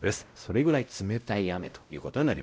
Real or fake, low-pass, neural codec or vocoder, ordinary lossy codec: fake; none; codec, 16 kHz, 0.5 kbps, X-Codec, WavLM features, trained on Multilingual LibriSpeech; none